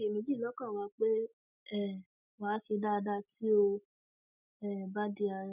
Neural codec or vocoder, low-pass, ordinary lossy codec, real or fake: none; 3.6 kHz; none; real